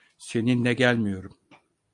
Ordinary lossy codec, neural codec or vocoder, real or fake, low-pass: MP3, 96 kbps; none; real; 10.8 kHz